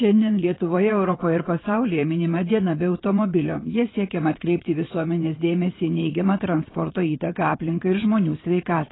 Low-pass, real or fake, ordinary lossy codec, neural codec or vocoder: 7.2 kHz; fake; AAC, 16 kbps; vocoder, 22.05 kHz, 80 mel bands, WaveNeXt